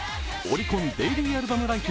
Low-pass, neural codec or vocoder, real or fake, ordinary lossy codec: none; none; real; none